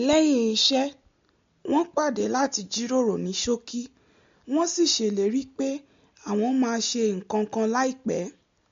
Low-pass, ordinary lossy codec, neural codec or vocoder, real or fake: 7.2 kHz; MP3, 48 kbps; none; real